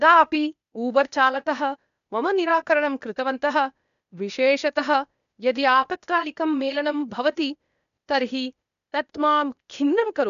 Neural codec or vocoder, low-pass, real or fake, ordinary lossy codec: codec, 16 kHz, 0.8 kbps, ZipCodec; 7.2 kHz; fake; none